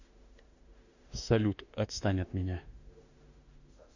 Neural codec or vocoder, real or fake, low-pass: autoencoder, 48 kHz, 32 numbers a frame, DAC-VAE, trained on Japanese speech; fake; 7.2 kHz